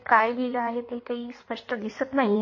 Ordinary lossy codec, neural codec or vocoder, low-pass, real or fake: MP3, 32 kbps; codec, 16 kHz in and 24 kHz out, 1.1 kbps, FireRedTTS-2 codec; 7.2 kHz; fake